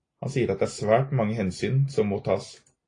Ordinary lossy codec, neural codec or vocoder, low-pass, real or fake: AAC, 32 kbps; none; 9.9 kHz; real